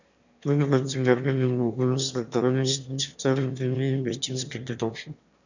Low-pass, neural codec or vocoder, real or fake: 7.2 kHz; autoencoder, 22.05 kHz, a latent of 192 numbers a frame, VITS, trained on one speaker; fake